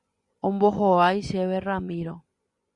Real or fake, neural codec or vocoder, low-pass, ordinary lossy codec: fake; vocoder, 24 kHz, 100 mel bands, Vocos; 10.8 kHz; Opus, 64 kbps